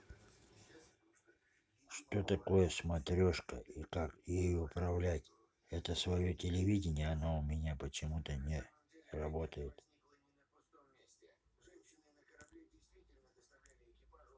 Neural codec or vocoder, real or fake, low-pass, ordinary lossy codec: none; real; none; none